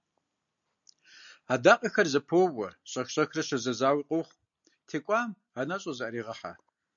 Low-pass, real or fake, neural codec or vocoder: 7.2 kHz; real; none